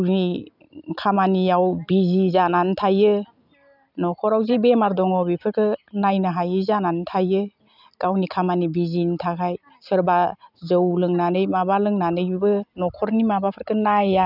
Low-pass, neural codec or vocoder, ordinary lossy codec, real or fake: 5.4 kHz; none; none; real